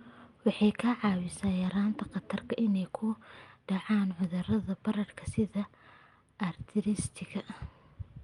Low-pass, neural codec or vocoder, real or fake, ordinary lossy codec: 10.8 kHz; none; real; Opus, 32 kbps